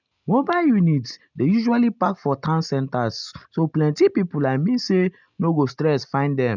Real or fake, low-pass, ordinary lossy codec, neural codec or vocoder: real; 7.2 kHz; none; none